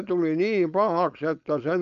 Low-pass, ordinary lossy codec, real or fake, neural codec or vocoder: 7.2 kHz; none; fake; codec, 16 kHz, 4.8 kbps, FACodec